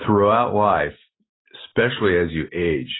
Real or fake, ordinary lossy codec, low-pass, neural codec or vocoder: real; AAC, 16 kbps; 7.2 kHz; none